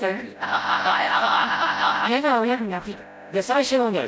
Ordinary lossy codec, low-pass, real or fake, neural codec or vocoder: none; none; fake; codec, 16 kHz, 0.5 kbps, FreqCodec, smaller model